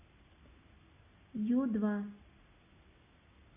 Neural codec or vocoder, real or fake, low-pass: none; real; 3.6 kHz